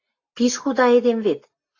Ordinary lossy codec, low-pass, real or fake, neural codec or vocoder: AAC, 48 kbps; 7.2 kHz; real; none